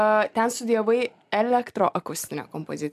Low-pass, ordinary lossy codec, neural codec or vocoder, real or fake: 14.4 kHz; AAC, 96 kbps; none; real